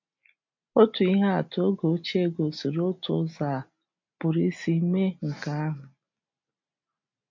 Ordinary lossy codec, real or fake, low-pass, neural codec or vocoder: MP3, 48 kbps; real; 7.2 kHz; none